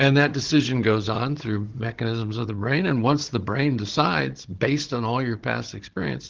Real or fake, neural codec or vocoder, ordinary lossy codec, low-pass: fake; codec, 16 kHz, 16 kbps, FunCodec, trained on Chinese and English, 50 frames a second; Opus, 16 kbps; 7.2 kHz